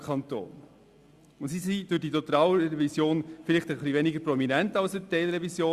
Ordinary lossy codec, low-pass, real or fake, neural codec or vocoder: Opus, 64 kbps; 14.4 kHz; real; none